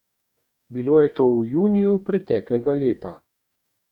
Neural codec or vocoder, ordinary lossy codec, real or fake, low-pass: codec, 44.1 kHz, 2.6 kbps, DAC; none; fake; 19.8 kHz